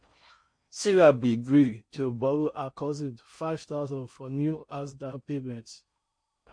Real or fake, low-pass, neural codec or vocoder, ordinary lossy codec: fake; 9.9 kHz; codec, 16 kHz in and 24 kHz out, 0.6 kbps, FocalCodec, streaming, 4096 codes; MP3, 48 kbps